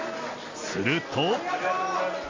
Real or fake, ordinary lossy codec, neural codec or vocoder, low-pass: real; MP3, 48 kbps; none; 7.2 kHz